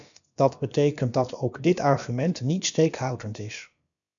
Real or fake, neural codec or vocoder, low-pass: fake; codec, 16 kHz, about 1 kbps, DyCAST, with the encoder's durations; 7.2 kHz